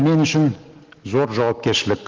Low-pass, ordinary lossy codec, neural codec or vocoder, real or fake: 7.2 kHz; Opus, 16 kbps; none; real